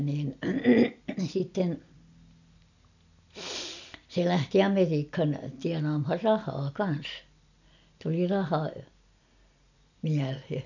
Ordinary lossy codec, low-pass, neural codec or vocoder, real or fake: none; 7.2 kHz; none; real